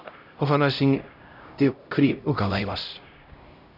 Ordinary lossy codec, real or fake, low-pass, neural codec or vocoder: none; fake; 5.4 kHz; codec, 16 kHz, 0.5 kbps, X-Codec, HuBERT features, trained on LibriSpeech